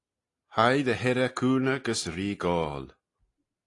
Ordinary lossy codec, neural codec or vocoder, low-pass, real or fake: AAC, 48 kbps; none; 10.8 kHz; real